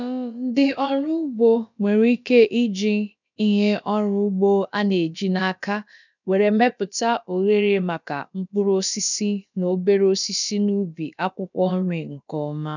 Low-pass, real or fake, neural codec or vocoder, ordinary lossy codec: 7.2 kHz; fake; codec, 16 kHz, about 1 kbps, DyCAST, with the encoder's durations; none